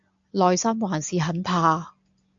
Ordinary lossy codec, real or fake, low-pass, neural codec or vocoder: AAC, 64 kbps; real; 7.2 kHz; none